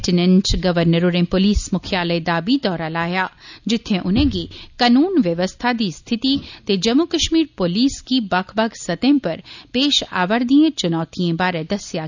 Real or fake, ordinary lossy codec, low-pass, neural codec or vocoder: real; none; 7.2 kHz; none